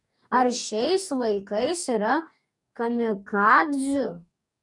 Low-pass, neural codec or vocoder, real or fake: 10.8 kHz; codec, 44.1 kHz, 2.6 kbps, DAC; fake